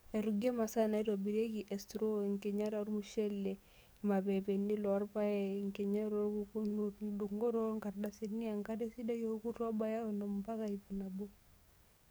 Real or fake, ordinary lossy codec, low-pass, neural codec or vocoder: fake; none; none; codec, 44.1 kHz, 7.8 kbps, DAC